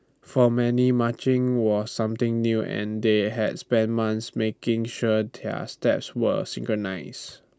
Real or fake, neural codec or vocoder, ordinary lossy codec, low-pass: real; none; none; none